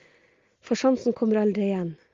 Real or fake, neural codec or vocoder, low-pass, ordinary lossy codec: real; none; 7.2 kHz; Opus, 32 kbps